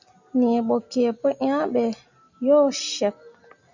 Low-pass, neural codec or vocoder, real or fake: 7.2 kHz; none; real